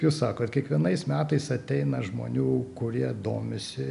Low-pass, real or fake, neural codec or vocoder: 10.8 kHz; real; none